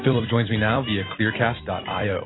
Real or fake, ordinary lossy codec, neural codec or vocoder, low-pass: fake; AAC, 16 kbps; vocoder, 44.1 kHz, 128 mel bands every 256 samples, BigVGAN v2; 7.2 kHz